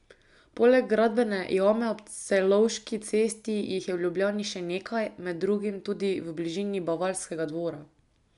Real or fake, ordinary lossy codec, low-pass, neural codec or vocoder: real; MP3, 96 kbps; 10.8 kHz; none